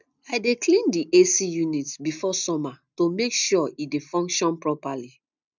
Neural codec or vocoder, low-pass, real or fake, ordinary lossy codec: none; 7.2 kHz; real; none